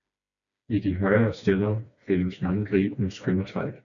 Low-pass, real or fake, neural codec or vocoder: 7.2 kHz; fake; codec, 16 kHz, 2 kbps, FreqCodec, smaller model